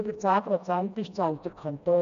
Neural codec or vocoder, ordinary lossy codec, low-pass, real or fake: codec, 16 kHz, 1 kbps, FreqCodec, smaller model; none; 7.2 kHz; fake